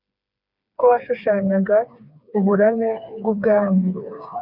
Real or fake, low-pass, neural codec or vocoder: fake; 5.4 kHz; codec, 16 kHz, 4 kbps, FreqCodec, smaller model